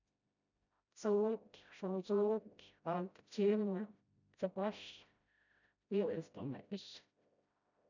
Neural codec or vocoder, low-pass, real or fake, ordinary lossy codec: codec, 16 kHz, 0.5 kbps, FreqCodec, smaller model; 7.2 kHz; fake; none